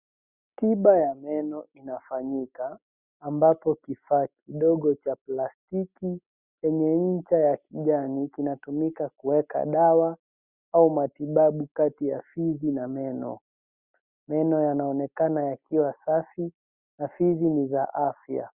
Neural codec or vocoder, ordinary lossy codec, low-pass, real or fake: none; Opus, 64 kbps; 3.6 kHz; real